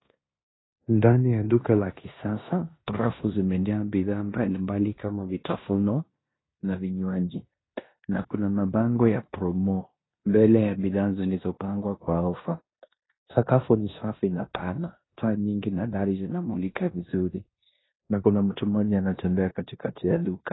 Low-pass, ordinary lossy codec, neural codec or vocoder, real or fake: 7.2 kHz; AAC, 16 kbps; codec, 16 kHz in and 24 kHz out, 0.9 kbps, LongCat-Audio-Codec, four codebook decoder; fake